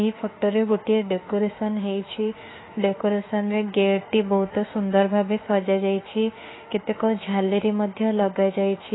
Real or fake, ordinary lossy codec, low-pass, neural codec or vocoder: fake; AAC, 16 kbps; 7.2 kHz; autoencoder, 48 kHz, 32 numbers a frame, DAC-VAE, trained on Japanese speech